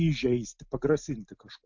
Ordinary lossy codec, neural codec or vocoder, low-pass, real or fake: MP3, 64 kbps; none; 7.2 kHz; real